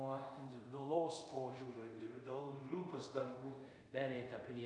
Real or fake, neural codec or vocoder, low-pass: fake; codec, 24 kHz, 0.5 kbps, DualCodec; 10.8 kHz